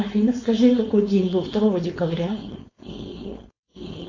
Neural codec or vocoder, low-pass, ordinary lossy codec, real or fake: codec, 16 kHz, 4.8 kbps, FACodec; 7.2 kHz; AAC, 32 kbps; fake